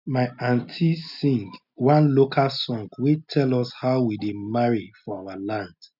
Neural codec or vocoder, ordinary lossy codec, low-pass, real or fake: none; none; 5.4 kHz; real